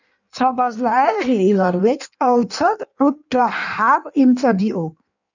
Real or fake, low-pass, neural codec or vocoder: fake; 7.2 kHz; codec, 16 kHz in and 24 kHz out, 1.1 kbps, FireRedTTS-2 codec